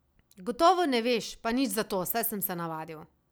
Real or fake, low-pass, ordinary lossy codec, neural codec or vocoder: real; none; none; none